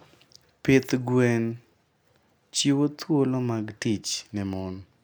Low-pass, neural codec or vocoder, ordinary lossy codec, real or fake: none; none; none; real